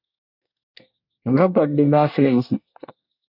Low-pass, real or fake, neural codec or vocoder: 5.4 kHz; fake; codec, 24 kHz, 1 kbps, SNAC